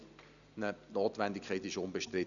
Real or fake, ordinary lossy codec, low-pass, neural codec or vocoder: real; none; 7.2 kHz; none